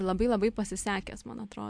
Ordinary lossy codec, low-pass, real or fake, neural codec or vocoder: MP3, 64 kbps; 9.9 kHz; real; none